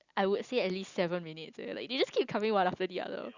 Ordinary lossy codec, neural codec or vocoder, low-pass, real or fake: Opus, 64 kbps; none; 7.2 kHz; real